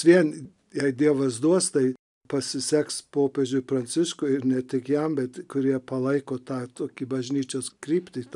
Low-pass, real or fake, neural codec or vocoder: 10.8 kHz; real; none